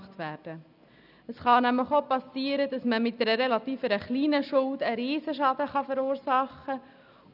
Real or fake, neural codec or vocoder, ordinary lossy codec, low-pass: real; none; none; 5.4 kHz